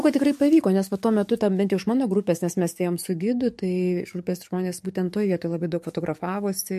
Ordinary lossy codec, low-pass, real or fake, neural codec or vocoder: MP3, 64 kbps; 14.4 kHz; fake; codec, 44.1 kHz, 7.8 kbps, DAC